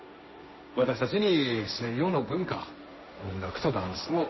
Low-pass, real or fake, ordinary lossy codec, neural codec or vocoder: 7.2 kHz; fake; MP3, 24 kbps; codec, 16 kHz, 1.1 kbps, Voila-Tokenizer